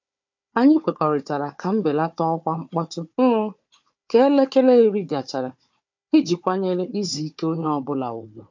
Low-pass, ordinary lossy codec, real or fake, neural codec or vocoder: 7.2 kHz; MP3, 48 kbps; fake; codec, 16 kHz, 4 kbps, FunCodec, trained on Chinese and English, 50 frames a second